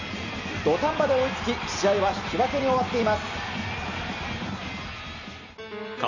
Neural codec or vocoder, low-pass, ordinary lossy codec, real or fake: none; 7.2 kHz; none; real